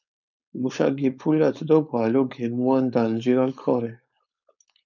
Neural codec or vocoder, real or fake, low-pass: codec, 16 kHz, 4.8 kbps, FACodec; fake; 7.2 kHz